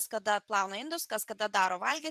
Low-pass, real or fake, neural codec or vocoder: 14.4 kHz; fake; vocoder, 44.1 kHz, 128 mel bands every 512 samples, BigVGAN v2